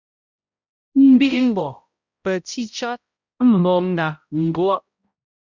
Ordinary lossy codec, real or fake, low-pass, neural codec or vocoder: Opus, 64 kbps; fake; 7.2 kHz; codec, 16 kHz, 0.5 kbps, X-Codec, HuBERT features, trained on balanced general audio